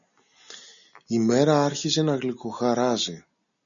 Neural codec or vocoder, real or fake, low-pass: none; real; 7.2 kHz